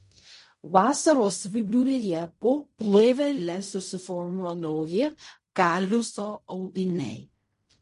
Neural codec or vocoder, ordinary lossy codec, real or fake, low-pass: codec, 16 kHz in and 24 kHz out, 0.4 kbps, LongCat-Audio-Codec, fine tuned four codebook decoder; MP3, 48 kbps; fake; 10.8 kHz